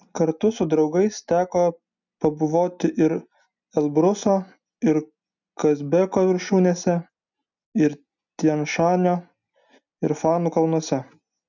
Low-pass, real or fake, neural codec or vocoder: 7.2 kHz; real; none